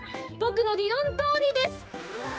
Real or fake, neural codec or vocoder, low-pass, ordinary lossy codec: fake; codec, 16 kHz, 2 kbps, X-Codec, HuBERT features, trained on general audio; none; none